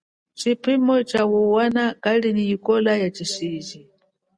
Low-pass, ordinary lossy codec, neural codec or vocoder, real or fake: 9.9 kHz; Opus, 64 kbps; none; real